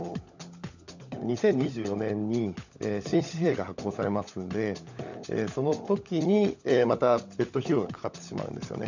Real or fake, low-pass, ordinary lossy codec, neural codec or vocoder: fake; 7.2 kHz; none; codec, 16 kHz, 16 kbps, FunCodec, trained on LibriTTS, 50 frames a second